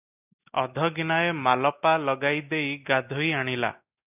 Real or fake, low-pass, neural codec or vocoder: real; 3.6 kHz; none